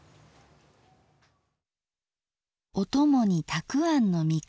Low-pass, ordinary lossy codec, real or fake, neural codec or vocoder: none; none; real; none